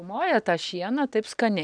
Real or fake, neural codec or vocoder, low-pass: real; none; 9.9 kHz